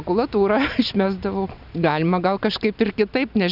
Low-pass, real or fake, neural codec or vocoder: 5.4 kHz; real; none